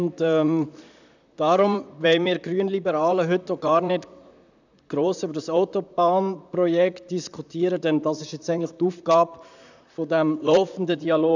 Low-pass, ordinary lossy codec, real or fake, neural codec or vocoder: 7.2 kHz; none; fake; vocoder, 44.1 kHz, 128 mel bands, Pupu-Vocoder